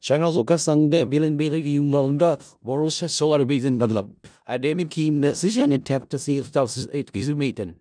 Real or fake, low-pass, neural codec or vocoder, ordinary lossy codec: fake; 9.9 kHz; codec, 16 kHz in and 24 kHz out, 0.4 kbps, LongCat-Audio-Codec, four codebook decoder; none